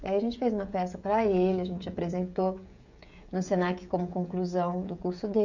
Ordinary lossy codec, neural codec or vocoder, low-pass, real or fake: none; codec, 16 kHz, 16 kbps, FreqCodec, smaller model; 7.2 kHz; fake